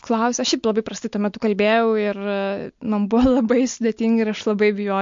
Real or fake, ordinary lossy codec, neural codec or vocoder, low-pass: real; MP3, 48 kbps; none; 7.2 kHz